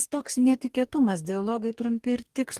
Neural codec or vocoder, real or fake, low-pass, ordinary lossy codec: codec, 44.1 kHz, 2.6 kbps, DAC; fake; 14.4 kHz; Opus, 24 kbps